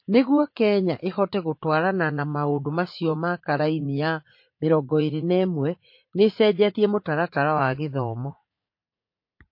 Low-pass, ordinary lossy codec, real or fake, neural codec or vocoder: 5.4 kHz; MP3, 32 kbps; fake; vocoder, 44.1 kHz, 80 mel bands, Vocos